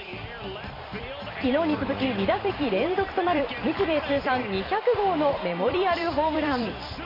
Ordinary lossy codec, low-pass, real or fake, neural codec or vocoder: MP3, 32 kbps; 5.4 kHz; fake; vocoder, 44.1 kHz, 128 mel bands every 256 samples, BigVGAN v2